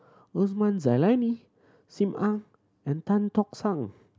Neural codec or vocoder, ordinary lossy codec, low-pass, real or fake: none; none; none; real